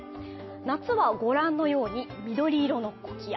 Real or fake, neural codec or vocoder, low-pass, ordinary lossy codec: real; none; 7.2 kHz; MP3, 24 kbps